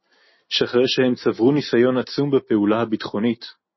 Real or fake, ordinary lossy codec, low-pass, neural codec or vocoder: real; MP3, 24 kbps; 7.2 kHz; none